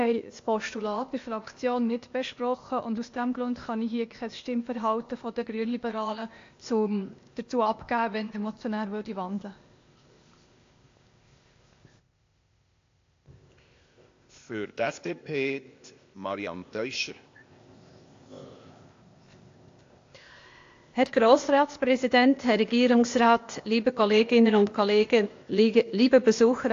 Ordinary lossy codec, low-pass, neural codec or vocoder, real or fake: AAC, 48 kbps; 7.2 kHz; codec, 16 kHz, 0.8 kbps, ZipCodec; fake